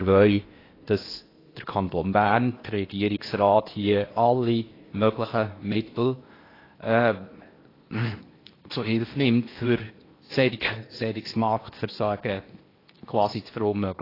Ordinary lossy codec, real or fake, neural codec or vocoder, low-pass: AAC, 24 kbps; fake; codec, 16 kHz in and 24 kHz out, 0.8 kbps, FocalCodec, streaming, 65536 codes; 5.4 kHz